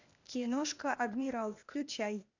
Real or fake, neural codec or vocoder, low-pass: fake; codec, 16 kHz, 0.8 kbps, ZipCodec; 7.2 kHz